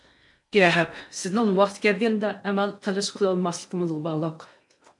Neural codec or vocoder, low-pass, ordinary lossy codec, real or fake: codec, 16 kHz in and 24 kHz out, 0.6 kbps, FocalCodec, streaming, 4096 codes; 10.8 kHz; MP3, 64 kbps; fake